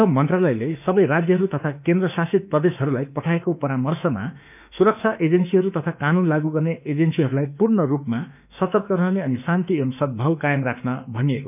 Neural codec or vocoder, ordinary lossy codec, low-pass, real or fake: autoencoder, 48 kHz, 32 numbers a frame, DAC-VAE, trained on Japanese speech; none; 3.6 kHz; fake